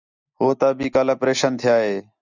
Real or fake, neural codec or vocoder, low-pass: real; none; 7.2 kHz